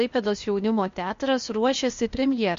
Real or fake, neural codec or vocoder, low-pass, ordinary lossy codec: fake; codec, 16 kHz, 0.8 kbps, ZipCodec; 7.2 kHz; MP3, 48 kbps